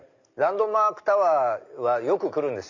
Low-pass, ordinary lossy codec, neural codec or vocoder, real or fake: 7.2 kHz; none; none; real